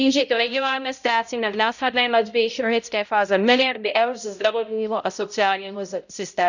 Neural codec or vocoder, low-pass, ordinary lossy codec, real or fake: codec, 16 kHz, 0.5 kbps, X-Codec, HuBERT features, trained on balanced general audio; 7.2 kHz; none; fake